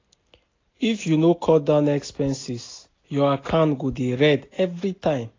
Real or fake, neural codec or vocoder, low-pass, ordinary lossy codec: real; none; 7.2 kHz; AAC, 32 kbps